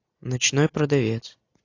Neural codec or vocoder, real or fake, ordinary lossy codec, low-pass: none; real; AAC, 48 kbps; 7.2 kHz